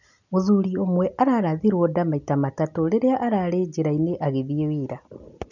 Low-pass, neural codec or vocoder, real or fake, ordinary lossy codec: 7.2 kHz; none; real; none